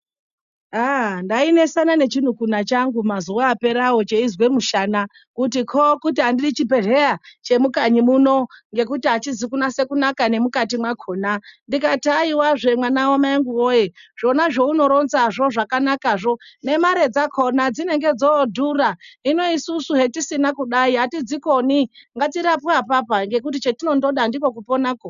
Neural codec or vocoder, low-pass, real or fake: none; 7.2 kHz; real